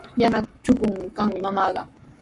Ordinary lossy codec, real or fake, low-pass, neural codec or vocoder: Opus, 64 kbps; fake; 10.8 kHz; codec, 44.1 kHz, 7.8 kbps, Pupu-Codec